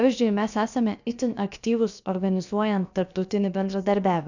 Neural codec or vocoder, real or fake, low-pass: codec, 16 kHz, about 1 kbps, DyCAST, with the encoder's durations; fake; 7.2 kHz